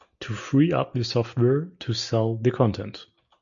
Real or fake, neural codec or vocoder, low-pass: real; none; 7.2 kHz